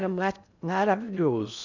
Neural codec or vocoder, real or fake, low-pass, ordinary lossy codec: codec, 16 kHz in and 24 kHz out, 0.6 kbps, FocalCodec, streaming, 2048 codes; fake; 7.2 kHz; none